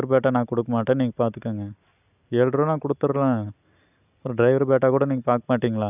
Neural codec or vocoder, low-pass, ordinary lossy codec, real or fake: none; 3.6 kHz; none; real